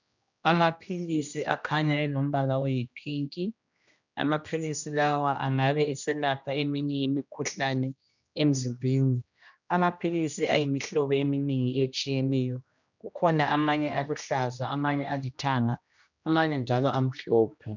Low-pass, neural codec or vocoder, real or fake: 7.2 kHz; codec, 16 kHz, 1 kbps, X-Codec, HuBERT features, trained on general audio; fake